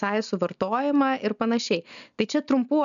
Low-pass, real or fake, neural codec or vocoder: 7.2 kHz; real; none